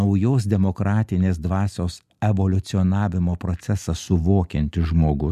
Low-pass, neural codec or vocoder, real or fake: 14.4 kHz; none; real